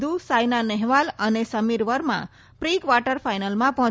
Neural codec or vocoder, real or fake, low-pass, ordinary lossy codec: none; real; none; none